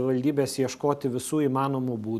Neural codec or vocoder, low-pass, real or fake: none; 14.4 kHz; real